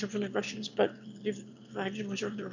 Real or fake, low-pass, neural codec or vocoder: fake; 7.2 kHz; autoencoder, 22.05 kHz, a latent of 192 numbers a frame, VITS, trained on one speaker